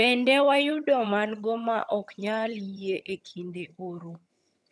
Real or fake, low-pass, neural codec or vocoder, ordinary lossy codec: fake; none; vocoder, 22.05 kHz, 80 mel bands, HiFi-GAN; none